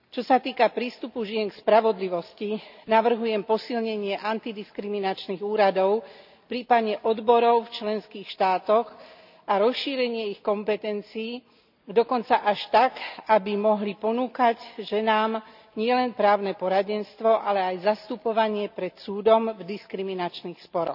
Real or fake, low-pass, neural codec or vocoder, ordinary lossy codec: real; 5.4 kHz; none; none